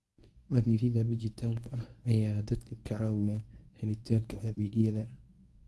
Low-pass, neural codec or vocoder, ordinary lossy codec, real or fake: none; codec, 24 kHz, 0.9 kbps, WavTokenizer, medium speech release version 1; none; fake